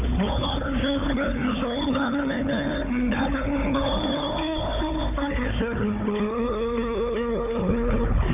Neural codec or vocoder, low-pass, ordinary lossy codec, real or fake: codec, 16 kHz, 4 kbps, FunCodec, trained on Chinese and English, 50 frames a second; 3.6 kHz; none; fake